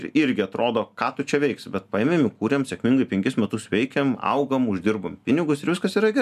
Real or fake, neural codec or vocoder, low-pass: real; none; 14.4 kHz